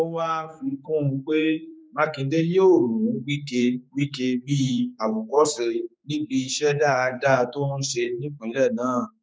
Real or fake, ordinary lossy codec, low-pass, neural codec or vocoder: fake; none; none; codec, 16 kHz, 4 kbps, X-Codec, HuBERT features, trained on general audio